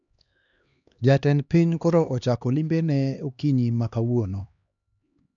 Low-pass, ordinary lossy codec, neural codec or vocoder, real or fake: 7.2 kHz; none; codec, 16 kHz, 2 kbps, X-Codec, HuBERT features, trained on LibriSpeech; fake